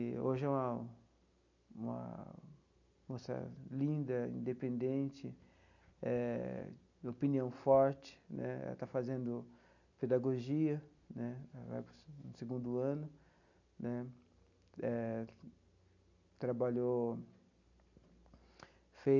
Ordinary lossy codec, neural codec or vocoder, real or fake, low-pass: none; none; real; 7.2 kHz